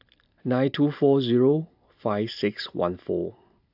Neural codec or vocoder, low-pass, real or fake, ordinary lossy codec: none; 5.4 kHz; real; AAC, 48 kbps